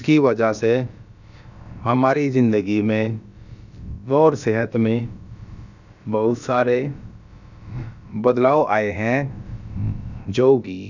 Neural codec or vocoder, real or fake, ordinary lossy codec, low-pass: codec, 16 kHz, about 1 kbps, DyCAST, with the encoder's durations; fake; none; 7.2 kHz